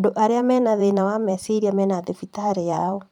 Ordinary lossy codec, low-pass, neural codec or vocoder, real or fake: none; 19.8 kHz; vocoder, 44.1 kHz, 128 mel bands every 512 samples, BigVGAN v2; fake